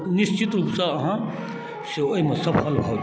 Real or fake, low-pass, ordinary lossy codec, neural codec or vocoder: real; none; none; none